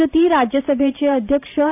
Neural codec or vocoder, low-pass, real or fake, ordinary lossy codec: vocoder, 44.1 kHz, 128 mel bands every 512 samples, BigVGAN v2; 3.6 kHz; fake; none